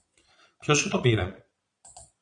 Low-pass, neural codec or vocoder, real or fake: 9.9 kHz; vocoder, 22.05 kHz, 80 mel bands, Vocos; fake